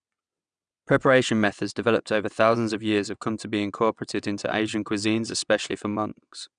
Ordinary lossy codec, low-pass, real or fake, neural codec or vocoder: none; 9.9 kHz; fake; vocoder, 22.05 kHz, 80 mel bands, WaveNeXt